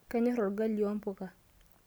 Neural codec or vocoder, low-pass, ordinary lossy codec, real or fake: none; none; none; real